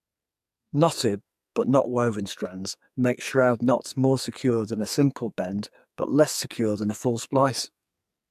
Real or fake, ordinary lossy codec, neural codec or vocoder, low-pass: fake; MP3, 96 kbps; codec, 44.1 kHz, 2.6 kbps, SNAC; 14.4 kHz